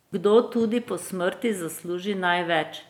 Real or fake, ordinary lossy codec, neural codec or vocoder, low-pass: real; none; none; 19.8 kHz